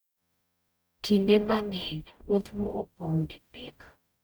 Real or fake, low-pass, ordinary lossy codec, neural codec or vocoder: fake; none; none; codec, 44.1 kHz, 0.9 kbps, DAC